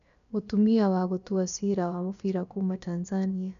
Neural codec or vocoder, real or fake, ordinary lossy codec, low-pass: codec, 16 kHz, about 1 kbps, DyCAST, with the encoder's durations; fake; none; 7.2 kHz